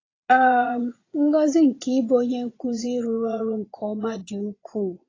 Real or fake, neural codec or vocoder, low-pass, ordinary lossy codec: fake; vocoder, 22.05 kHz, 80 mel bands, Vocos; 7.2 kHz; AAC, 32 kbps